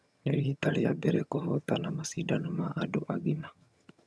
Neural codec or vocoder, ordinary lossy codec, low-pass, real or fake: vocoder, 22.05 kHz, 80 mel bands, HiFi-GAN; none; none; fake